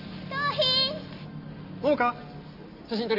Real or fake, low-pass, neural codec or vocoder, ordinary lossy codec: real; 5.4 kHz; none; none